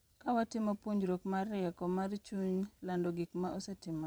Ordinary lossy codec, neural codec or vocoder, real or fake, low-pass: none; vocoder, 44.1 kHz, 128 mel bands every 512 samples, BigVGAN v2; fake; none